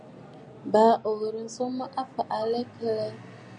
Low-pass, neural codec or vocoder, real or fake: 9.9 kHz; none; real